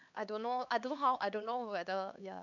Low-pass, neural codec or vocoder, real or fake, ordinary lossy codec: 7.2 kHz; codec, 16 kHz, 4 kbps, X-Codec, HuBERT features, trained on LibriSpeech; fake; none